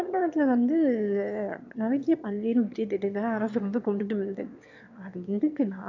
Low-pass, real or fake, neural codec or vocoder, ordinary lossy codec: 7.2 kHz; fake; autoencoder, 22.05 kHz, a latent of 192 numbers a frame, VITS, trained on one speaker; none